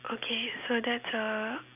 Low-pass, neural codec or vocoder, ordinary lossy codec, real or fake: 3.6 kHz; none; none; real